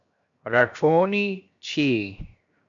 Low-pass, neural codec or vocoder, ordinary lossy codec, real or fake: 7.2 kHz; codec, 16 kHz, 0.7 kbps, FocalCodec; AAC, 64 kbps; fake